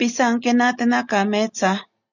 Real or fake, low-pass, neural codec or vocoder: real; 7.2 kHz; none